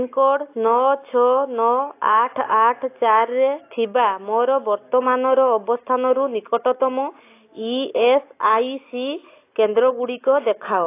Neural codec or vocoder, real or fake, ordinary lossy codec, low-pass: none; real; AAC, 24 kbps; 3.6 kHz